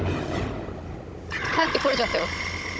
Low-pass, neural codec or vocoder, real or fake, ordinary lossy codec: none; codec, 16 kHz, 16 kbps, FunCodec, trained on Chinese and English, 50 frames a second; fake; none